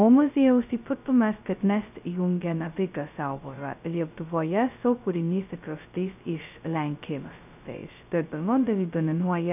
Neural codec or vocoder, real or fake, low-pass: codec, 16 kHz, 0.2 kbps, FocalCodec; fake; 3.6 kHz